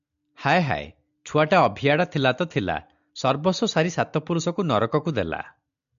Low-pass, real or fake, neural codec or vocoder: 7.2 kHz; real; none